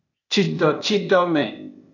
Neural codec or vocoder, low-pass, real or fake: codec, 16 kHz, 0.8 kbps, ZipCodec; 7.2 kHz; fake